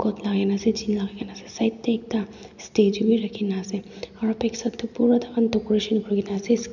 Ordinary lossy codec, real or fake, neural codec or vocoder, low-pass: none; real; none; 7.2 kHz